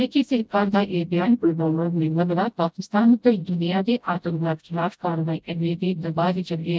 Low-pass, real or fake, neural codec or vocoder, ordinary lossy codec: none; fake; codec, 16 kHz, 0.5 kbps, FreqCodec, smaller model; none